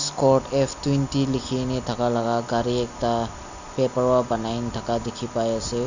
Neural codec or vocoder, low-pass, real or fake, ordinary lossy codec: none; 7.2 kHz; real; none